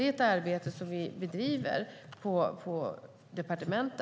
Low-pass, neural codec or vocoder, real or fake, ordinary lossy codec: none; none; real; none